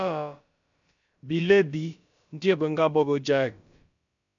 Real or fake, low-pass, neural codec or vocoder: fake; 7.2 kHz; codec, 16 kHz, about 1 kbps, DyCAST, with the encoder's durations